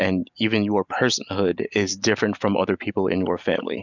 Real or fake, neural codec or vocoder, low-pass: real; none; 7.2 kHz